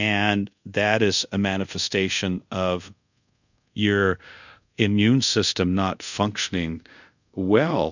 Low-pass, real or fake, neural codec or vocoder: 7.2 kHz; fake; codec, 24 kHz, 0.5 kbps, DualCodec